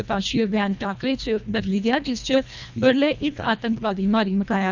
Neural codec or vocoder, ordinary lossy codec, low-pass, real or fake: codec, 24 kHz, 1.5 kbps, HILCodec; none; 7.2 kHz; fake